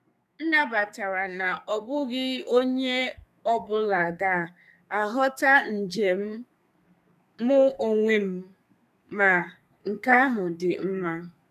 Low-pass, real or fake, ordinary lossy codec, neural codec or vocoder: 14.4 kHz; fake; none; codec, 32 kHz, 1.9 kbps, SNAC